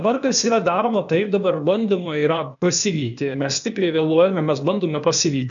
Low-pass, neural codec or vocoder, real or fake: 7.2 kHz; codec, 16 kHz, 0.8 kbps, ZipCodec; fake